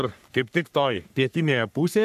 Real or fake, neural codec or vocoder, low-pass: fake; codec, 44.1 kHz, 3.4 kbps, Pupu-Codec; 14.4 kHz